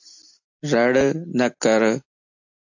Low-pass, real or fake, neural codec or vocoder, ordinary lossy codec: 7.2 kHz; real; none; AAC, 48 kbps